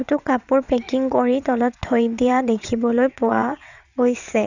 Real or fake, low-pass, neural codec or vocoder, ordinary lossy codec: fake; 7.2 kHz; vocoder, 22.05 kHz, 80 mel bands, WaveNeXt; none